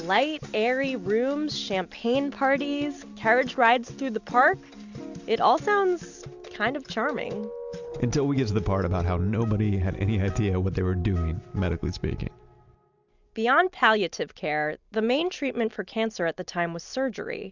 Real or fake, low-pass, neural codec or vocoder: real; 7.2 kHz; none